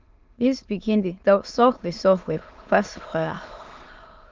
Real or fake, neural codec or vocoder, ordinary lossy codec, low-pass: fake; autoencoder, 22.05 kHz, a latent of 192 numbers a frame, VITS, trained on many speakers; Opus, 24 kbps; 7.2 kHz